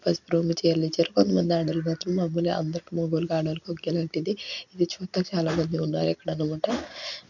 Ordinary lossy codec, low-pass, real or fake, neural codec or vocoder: none; 7.2 kHz; real; none